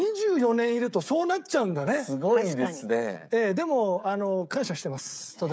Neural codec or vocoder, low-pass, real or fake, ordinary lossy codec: codec, 16 kHz, 16 kbps, FreqCodec, smaller model; none; fake; none